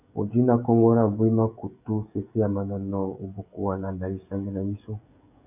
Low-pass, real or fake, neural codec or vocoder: 3.6 kHz; fake; codec, 16 kHz, 16 kbps, FreqCodec, smaller model